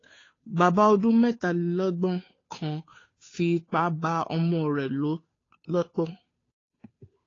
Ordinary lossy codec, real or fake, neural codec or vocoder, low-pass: AAC, 32 kbps; fake; codec, 16 kHz, 2 kbps, FunCodec, trained on Chinese and English, 25 frames a second; 7.2 kHz